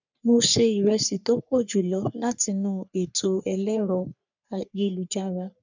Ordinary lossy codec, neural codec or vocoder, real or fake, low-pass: none; codec, 44.1 kHz, 3.4 kbps, Pupu-Codec; fake; 7.2 kHz